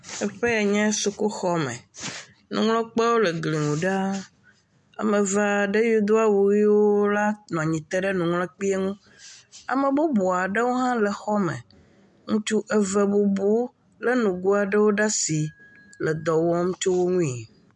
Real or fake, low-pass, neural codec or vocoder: real; 10.8 kHz; none